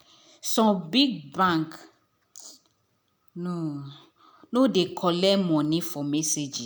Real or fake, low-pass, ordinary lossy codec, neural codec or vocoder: real; none; none; none